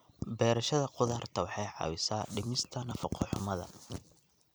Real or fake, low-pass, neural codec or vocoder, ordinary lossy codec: fake; none; vocoder, 44.1 kHz, 128 mel bands every 256 samples, BigVGAN v2; none